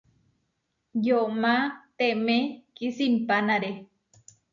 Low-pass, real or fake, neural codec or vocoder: 7.2 kHz; real; none